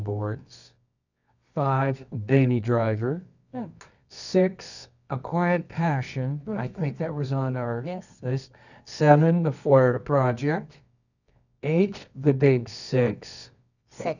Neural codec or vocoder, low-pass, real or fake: codec, 24 kHz, 0.9 kbps, WavTokenizer, medium music audio release; 7.2 kHz; fake